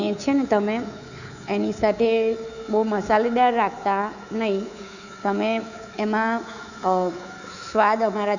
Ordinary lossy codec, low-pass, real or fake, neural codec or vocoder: none; 7.2 kHz; fake; codec, 24 kHz, 3.1 kbps, DualCodec